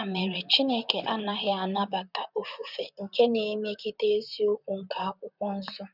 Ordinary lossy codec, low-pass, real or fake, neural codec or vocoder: none; 5.4 kHz; fake; vocoder, 44.1 kHz, 128 mel bands, Pupu-Vocoder